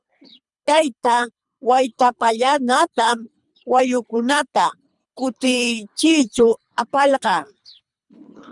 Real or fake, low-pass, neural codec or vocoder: fake; 10.8 kHz; codec, 24 kHz, 3 kbps, HILCodec